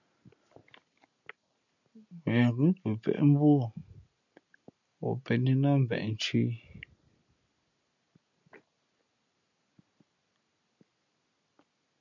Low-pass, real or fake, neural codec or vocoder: 7.2 kHz; real; none